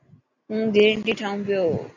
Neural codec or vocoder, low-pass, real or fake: none; 7.2 kHz; real